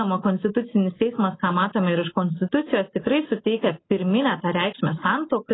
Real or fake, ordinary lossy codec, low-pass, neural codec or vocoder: real; AAC, 16 kbps; 7.2 kHz; none